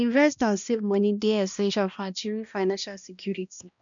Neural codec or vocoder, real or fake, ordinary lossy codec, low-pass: codec, 16 kHz, 1 kbps, X-Codec, HuBERT features, trained on balanced general audio; fake; none; 7.2 kHz